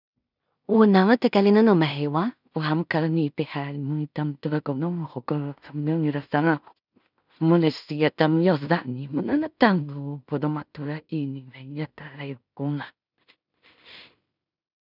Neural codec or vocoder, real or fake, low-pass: codec, 16 kHz in and 24 kHz out, 0.4 kbps, LongCat-Audio-Codec, two codebook decoder; fake; 5.4 kHz